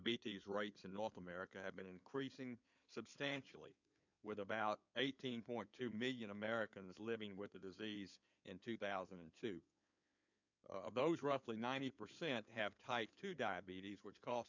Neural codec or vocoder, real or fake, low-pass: codec, 16 kHz in and 24 kHz out, 2.2 kbps, FireRedTTS-2 codec; fake; 7.2 kHz